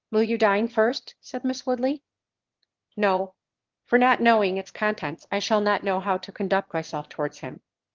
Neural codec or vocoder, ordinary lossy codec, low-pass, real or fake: autoencoder, 22.05 kHz, a latent of 192 numbers a frame, VITS, trained on one speaker; Opus, 16 kbps; 7.2 kHz; fake